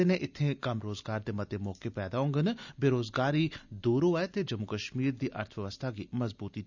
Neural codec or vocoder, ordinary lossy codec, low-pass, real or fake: none; none; 7.2 kHz; real